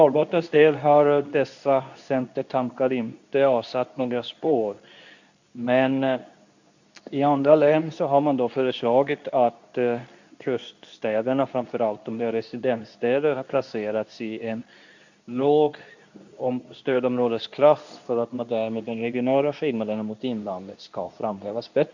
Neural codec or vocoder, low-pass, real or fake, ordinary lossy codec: codec, 24 kHz, 0.9 kbps, WavTokenizer, medium speech release version 2; 7.2 kHz; fake; none